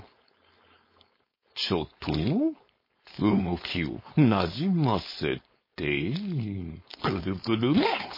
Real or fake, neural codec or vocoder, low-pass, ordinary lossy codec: fake; codec, 16 kHz, 4.8 kbps, FACodec; 5.4 kHz; MP3, 24 kbps